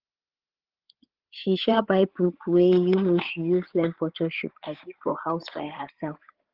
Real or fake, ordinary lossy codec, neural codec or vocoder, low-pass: fake; Opus, 16 kbps; codec, 16 kHz, 8 kbps, FreqCodec, larger model; 5.4 kHz